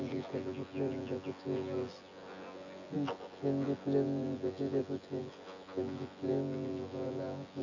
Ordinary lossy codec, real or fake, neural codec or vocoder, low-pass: none; fake; vocoder, 24 kHz, 100 mel bands, Vocos; 7.2 kHz